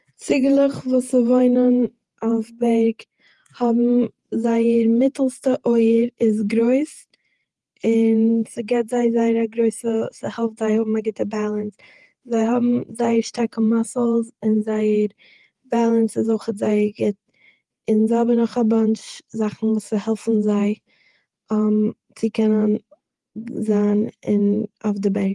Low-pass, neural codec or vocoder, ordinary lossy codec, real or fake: 10.8 kHz; vocoder, 48 kHz, 128 mel bands, Vocos; Opus, 24 kbps; fake